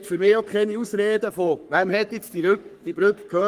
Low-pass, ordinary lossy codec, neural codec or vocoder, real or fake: 14.4 kHz; Opus, 24 kbps; codec, 44.1 kHz, 3.4 kbps, Pupu-Codec; fake